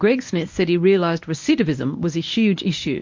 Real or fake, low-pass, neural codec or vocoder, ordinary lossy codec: fake; 7.2 kHz; codec, 24 kHz, 0.9 kbps, WavTokenizer, medium speech release version 1; MP3, 48 kbps